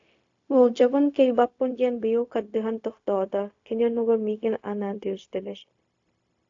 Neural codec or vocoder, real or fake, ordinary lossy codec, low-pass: codec, 16 kHz, 0.4 kbps, LongCat-Audio-Codec; fake; AAC, 48 kbps; 7.2 kHz